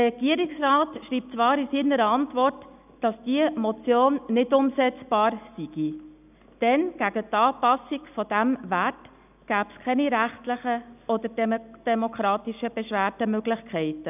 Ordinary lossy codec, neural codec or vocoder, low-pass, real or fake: none; none; 3.6 kHz; real